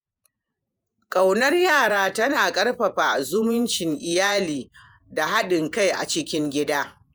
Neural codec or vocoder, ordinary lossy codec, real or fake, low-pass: vocoder, 48 kHz, 128 mel bands, Vocos; none; fake; none